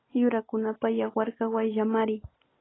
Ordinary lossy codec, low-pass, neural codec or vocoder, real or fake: AAC, 16 kbps; 7.2 kHz; none; real